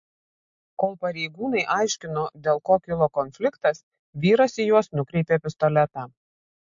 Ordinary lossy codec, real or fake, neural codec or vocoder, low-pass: MP3, 48 kbps; real; none; 7.2 kHz